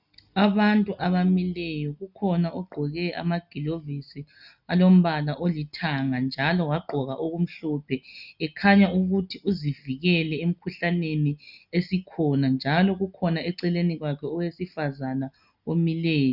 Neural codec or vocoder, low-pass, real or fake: none; 5.4 kHz; real